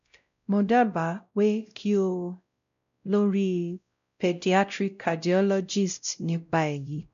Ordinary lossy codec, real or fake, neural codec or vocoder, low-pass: none; fake; codec, 16 kHz, 0.5 kbps, X-Codec, WavLM features, trained on Multilingual LibriSpeech; 7.2 kHz